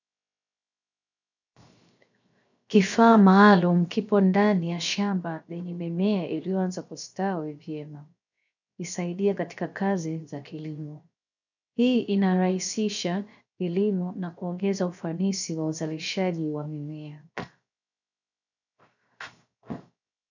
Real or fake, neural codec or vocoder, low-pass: fake; codec, 16 kHz, 0.7 kbps, FocalCodec; 7.2 kHz